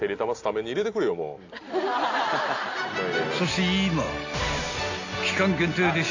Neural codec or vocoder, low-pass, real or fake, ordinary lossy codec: none; 7.2 kHz; real; AAC, 48 kbps